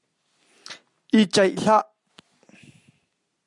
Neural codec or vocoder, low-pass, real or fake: none; 10.8 kHz; real